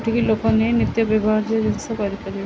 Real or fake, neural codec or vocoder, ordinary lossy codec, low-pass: real; none; none; none